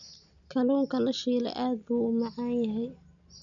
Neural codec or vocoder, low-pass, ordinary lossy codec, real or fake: none; 7.2 kHz; none; real